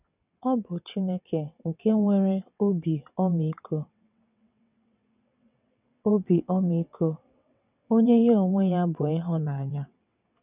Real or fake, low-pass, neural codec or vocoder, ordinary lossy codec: fake; 3.6 kHz; vocoder, 22.05 kHz, 80 mel bands, WaveNeXt; none